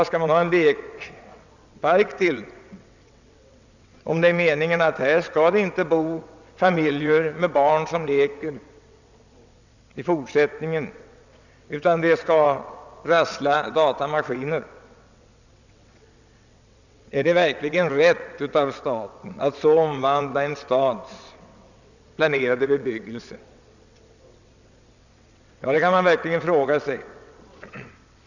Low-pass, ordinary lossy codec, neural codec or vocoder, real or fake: 7.2 kHz; none; vocoder, 22.05 kHz, 80 mel bands, WaveNeXt; fake